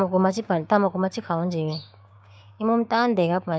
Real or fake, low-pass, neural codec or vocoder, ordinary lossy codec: fake; none; codec, 16 kHz, 6 kbps, DAC; none